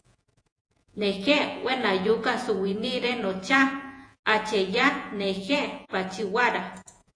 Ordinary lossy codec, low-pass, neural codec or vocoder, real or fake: AAC, 48 kbps; 9.9 kHz; vocoder, 48 kHz, 128 mel bands, Vocos; fake